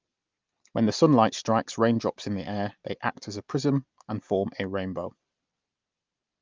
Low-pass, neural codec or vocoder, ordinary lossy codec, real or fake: 7.2 kHz; none; Opus, 24 kbps; real